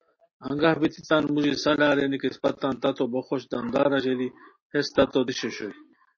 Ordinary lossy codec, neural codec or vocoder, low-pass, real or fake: MP3, 32 kbps; none; 7.2 kHz; real